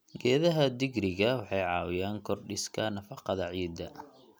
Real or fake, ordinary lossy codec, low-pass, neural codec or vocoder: real; none; none; none